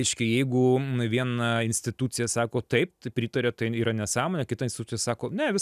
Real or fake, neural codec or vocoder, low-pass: real; none; 14.4 kHz